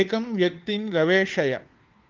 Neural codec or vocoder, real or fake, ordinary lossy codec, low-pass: codec, 16 kHz, 4 kbps, FunCodec, trained on LibriTTS, 50 frames a second; fake; Opus, 24 kbps; 7.2 kHz